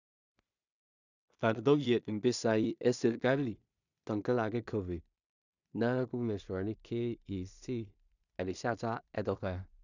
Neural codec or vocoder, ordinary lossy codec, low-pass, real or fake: codec, 16 kHz in and 24 kHz out, 0.4 kbps, LongCat-Audio-Codec, two codebook decoder; none; 7.2 kHz; fake